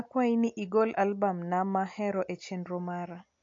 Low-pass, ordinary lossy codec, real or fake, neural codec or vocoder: 7.2 kHz; AAC, 48 kbps; real; none